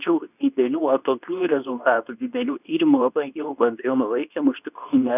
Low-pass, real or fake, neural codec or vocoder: 3.6 kHz; fake; codec, 24 kHz, 0.9 kbps, WavTokenizer, medium speech release version 2